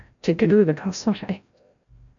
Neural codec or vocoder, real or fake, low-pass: codec, 16 kHz, 0.5 kbps, FreqCodec, larger model; fake; 7.2 kHz